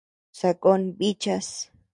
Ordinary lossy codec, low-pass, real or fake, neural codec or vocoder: MP3, 64 kbps; 10.8 kHz; real; none